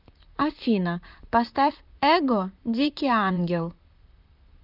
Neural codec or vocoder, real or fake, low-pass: vocoder, 44.1 kHz, 128 mel bands, Pupu-Vocoder; fake; 5.4 kHz